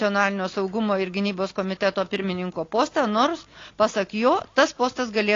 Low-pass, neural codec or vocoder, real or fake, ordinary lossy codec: 7.2 kHz; none; real; AAC, 32 kbps